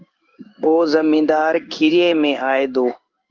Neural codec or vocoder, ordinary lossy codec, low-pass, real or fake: codec, 16 kHz in and 24 kHz out, 1 kbps, XY-Tokenizer; Opus, 24 kbps; 7.2 kHz; fake